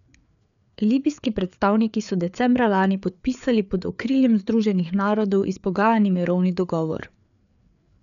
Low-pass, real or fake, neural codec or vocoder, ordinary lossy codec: 7.2 kHz; fake; codec, 16 kHz, 4 kbps, FreqCodec, larger model; none